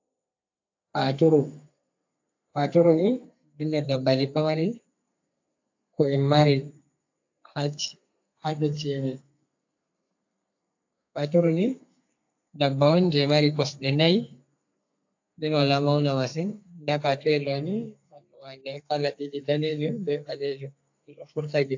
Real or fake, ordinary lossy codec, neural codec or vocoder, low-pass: fake; AAC, 48 kbps; codec, 32 kHz, 1.9 kbps, SNAC; 7.2 kHz